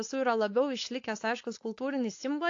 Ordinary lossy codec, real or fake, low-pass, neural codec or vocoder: AAC, 48 kbps; fake; 7.2 kHz; codec, 16 kHz, 4.8 kbps, FACodec